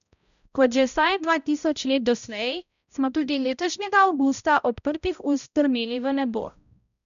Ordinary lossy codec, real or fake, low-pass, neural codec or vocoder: none; fake; 7.2 kHz; codec, 16 kHz, 0.5 kbps, X-Codec, HuBERT features, trained on balanced general audio